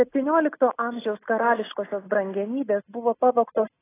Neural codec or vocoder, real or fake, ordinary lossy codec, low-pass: none; real; AAC, 16 kbps; 3.6 kHz